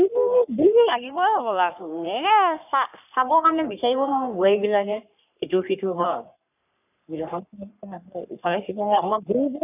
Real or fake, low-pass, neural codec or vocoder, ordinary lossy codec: fake; 3.6 kHz; codec, 44.1 kHz, 3.4 kbps, Pupu-Codec; none